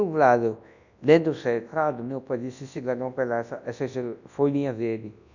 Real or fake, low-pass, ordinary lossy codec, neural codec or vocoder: fake; 7.2 kHz; none; codec, 24 kHz, 0.9 kbps, WavTokenizer, large speech release